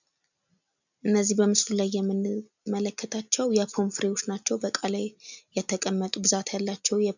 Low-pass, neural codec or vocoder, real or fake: 7.2 kHz; none; real